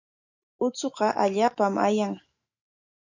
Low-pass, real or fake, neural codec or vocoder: 7.2 kHz; fake; autoencoder, 48 kHz, 128 numbers a frame, DAC-VAE, trained on Japanese speech